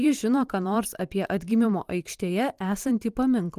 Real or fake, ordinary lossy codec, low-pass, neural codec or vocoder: fake; Opus, 24 kbps; 14.4 kHz; vocoder, 44.1 kHz, 128 mel bands every 512 samples, BigVGAN v2